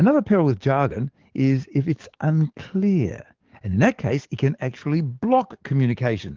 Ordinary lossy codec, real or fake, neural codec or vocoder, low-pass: Opus, 16 kbps; fake; codec, 44.1 kHz, 7.8 kbps, DAC; 7.2 kHz